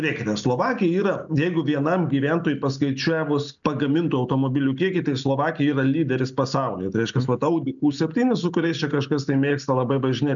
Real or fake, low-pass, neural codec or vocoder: real; 7.2 kHz; none